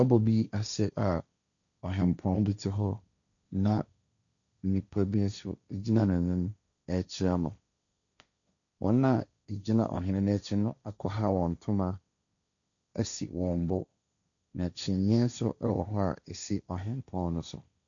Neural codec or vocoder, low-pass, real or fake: codec, 16 kHz, 1.1 kbps, Voila-Tokenizer; 7.2 kHz; fake